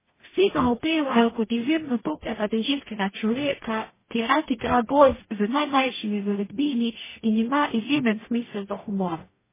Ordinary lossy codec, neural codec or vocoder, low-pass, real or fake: MP3, 16 kbps; codec, 44.1 kHz, 0.9 kbps, DAC; 3.6 kHz; fake